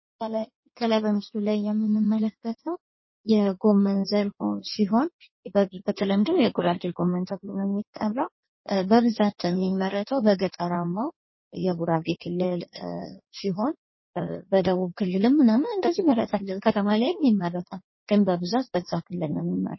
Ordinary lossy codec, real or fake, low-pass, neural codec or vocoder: MP3, 24 kbps; fake; 7.2 kHz; codec, 16 kHz in and 24 kHz out, 1.1 kbps, FireRedTTS-2 codec